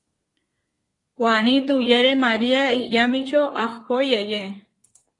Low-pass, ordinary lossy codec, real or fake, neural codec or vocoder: 10.8 kHz; AAC, 32 kbps; fake; codec, 24 kHz, 1 kbps, SNAC